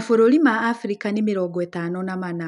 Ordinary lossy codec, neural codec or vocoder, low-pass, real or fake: none; none; 10.8 kHz; real